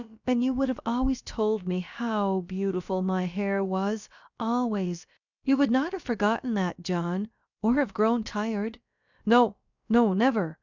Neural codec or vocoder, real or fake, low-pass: codec, 16 kHz, about 1 kbps, DyCAST, with the encoder's durations; fake; 7.2 kHz